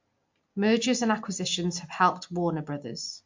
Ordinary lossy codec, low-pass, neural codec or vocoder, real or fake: MP3, 48 kbps; 7.2 kHz; none; real